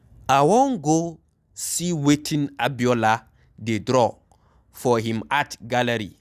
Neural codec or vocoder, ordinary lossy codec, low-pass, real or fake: none; none; 14.4 kHz; real